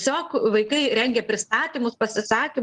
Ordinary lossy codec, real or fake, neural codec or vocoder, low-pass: Opus, 32 kbps; real; none; 10.8 kHz